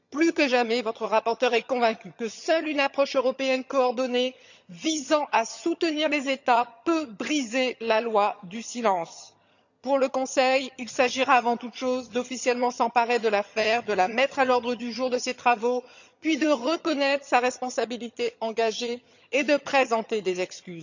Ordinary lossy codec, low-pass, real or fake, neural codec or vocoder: none; 7.2 kHz; fake; vocoder, 22.05 kHz, 80 mel bands, HiFi-GAN